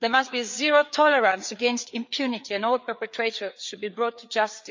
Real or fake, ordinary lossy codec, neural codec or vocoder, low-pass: fake; MP3, 64 kbps; codec, 16 kHz, 4 kbps, FreqCodec, larger model; 7.2 kHz